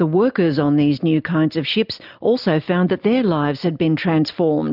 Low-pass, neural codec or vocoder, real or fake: 5.4 kHz; none; real